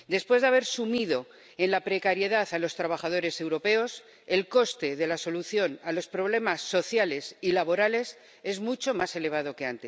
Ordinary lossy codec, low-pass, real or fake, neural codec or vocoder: none; none; real; none